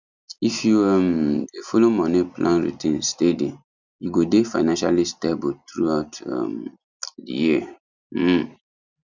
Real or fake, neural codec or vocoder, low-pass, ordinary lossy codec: real; none; 7.2 kHz; none